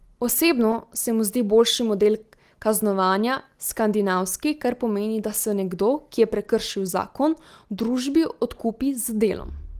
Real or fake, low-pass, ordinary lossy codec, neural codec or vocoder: real; 14.4 kHz; Opus, 24 kbps; none